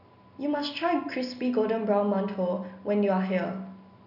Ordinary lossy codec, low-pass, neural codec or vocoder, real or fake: none; 5.4 kHz; none; real